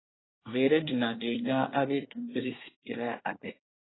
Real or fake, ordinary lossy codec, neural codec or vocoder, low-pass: fake; AAC, 16 kbps; codec, 24 kHz, 1 kbps, SNAC; 7.2 kHz